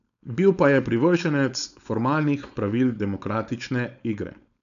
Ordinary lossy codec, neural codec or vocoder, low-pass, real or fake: none; codec, 16 kHz, 4.8 kbps, FACodec; 7.2 kHz; fake